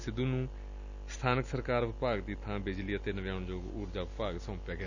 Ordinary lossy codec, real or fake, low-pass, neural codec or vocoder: none; real; 7.2 kHz; none